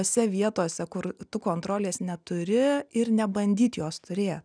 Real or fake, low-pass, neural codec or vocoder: real; 9.9 kHz; none